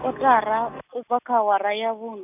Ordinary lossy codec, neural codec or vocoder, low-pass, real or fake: none; none; 3.6 kHz; real